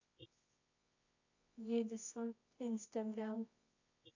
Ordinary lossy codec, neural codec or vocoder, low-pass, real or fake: AAC, 48 kbps; codec, 24 kHz, 0.9 kbps, WavTokenizer, medium music audio release; 7.2 kHz; fake